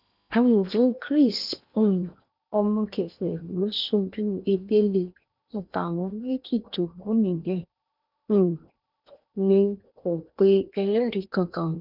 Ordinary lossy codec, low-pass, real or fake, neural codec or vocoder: none; 5.4 kHz; fake; codec, 16 kHz in and 24 kHz out, 0.8 kbps, FocalCodec, streaming, 65536 codes